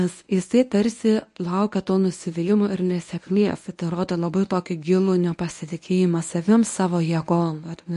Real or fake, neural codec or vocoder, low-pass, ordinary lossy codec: fake; codec, 24 kHz, 0.9 kbps, WavTokenizer, medium speech release version 1; 10.8 kHz; MP3, 48 kbps